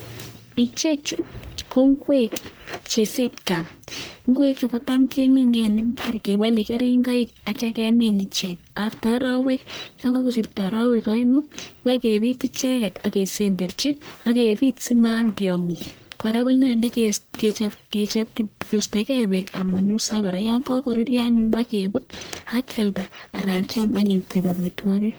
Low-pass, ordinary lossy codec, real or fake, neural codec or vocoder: none; none; fake; codec, 44.1 kHz, 1.7 kbps, Pupu-Codec